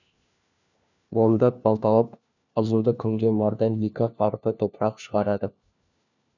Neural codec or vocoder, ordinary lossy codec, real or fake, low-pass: codec, 16 kHz, 1 kbps, FunCodec, trained on LibriTTS, 50 frames a second; none; fake; 7.2 kHz